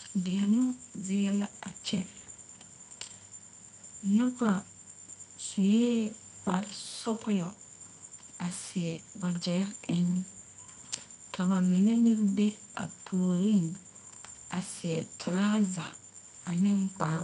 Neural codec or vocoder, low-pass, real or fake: codec, 24 kHz, 0.9 kbps, WavTokenizer, medium music audio release; 10.8 kHz; fake